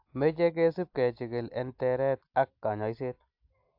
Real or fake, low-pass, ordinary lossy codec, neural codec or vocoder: real; 5.4 kHz; AAC, 48 kbps; none